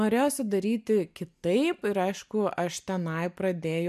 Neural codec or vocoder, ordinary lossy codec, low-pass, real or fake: none; MP3, 96 kbps; 14.4 kHz; real